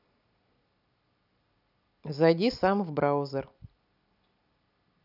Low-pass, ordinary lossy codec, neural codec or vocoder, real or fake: 5.4 kHz; none; none; real